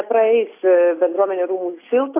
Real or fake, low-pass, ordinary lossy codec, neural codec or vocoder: real; 3.6 kHz; AAC, 24 kbps; none